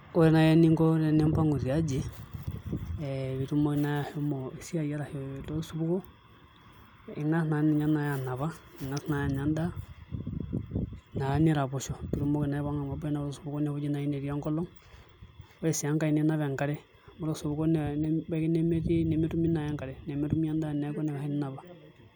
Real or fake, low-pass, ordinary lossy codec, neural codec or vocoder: real; none; none; none